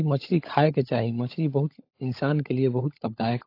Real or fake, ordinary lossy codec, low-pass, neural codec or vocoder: fake; AAC, 32 kbps; 5.4 kHz; codec, 16 kHz, 16 kbps, FunCodec, trained on LibriTTS, 50 frames a second